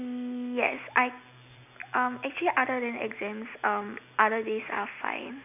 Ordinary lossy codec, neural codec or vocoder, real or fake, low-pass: none; none; real; 3.6 kHz